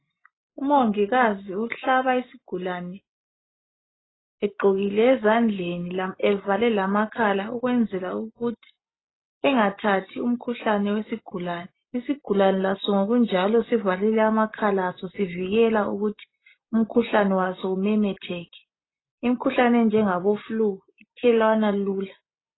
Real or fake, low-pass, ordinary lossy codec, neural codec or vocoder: real; 7.2 kHz; AAC, 16 kbps; none